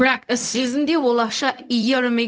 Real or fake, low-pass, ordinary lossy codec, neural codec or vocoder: fake; none; none; codec, 16 kHz, 0.4 kbps, LongCat-Audio-Codec